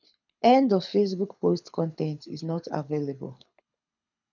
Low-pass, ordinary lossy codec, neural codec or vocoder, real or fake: 7.2 kHz; none; codec, 24 kHz, 6 kbps, HILCodec; fake